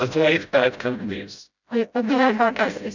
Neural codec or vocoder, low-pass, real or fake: codec, 16 kHz, 0.5 kbps, FreqCodec, smaller model; 7.2 kHz; fake